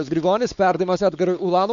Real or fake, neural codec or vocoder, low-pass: fake; codec, 16 kHz, 4 kbps, X-Codec, WavLM features, trained on Multilingual LibriSpeech; 7.2 kHz